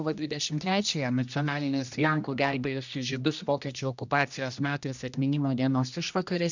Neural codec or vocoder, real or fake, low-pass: codec, 16 kHz, 1 kbps, X-Codec, HuBERT features, trained on general audio; fake; 7.2 kHz